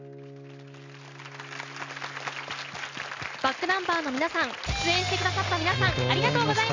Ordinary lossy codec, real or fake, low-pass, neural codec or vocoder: MP3, 64 kbps; real; 7.2 kHz; none